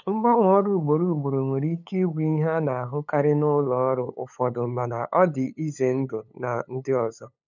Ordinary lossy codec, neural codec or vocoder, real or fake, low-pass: none; codec, 16 kHz, 8 kbps, FunCodec, trained on LibriTTS, 25 frames a second; fake; 7.2 kHz